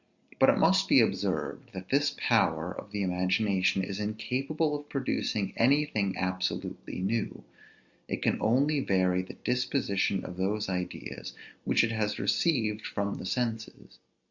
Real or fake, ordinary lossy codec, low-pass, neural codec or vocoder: real; Opus, 64 kbps; 7.2 kHz; none